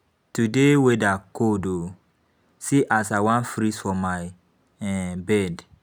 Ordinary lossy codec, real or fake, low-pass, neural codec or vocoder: none; real; none; none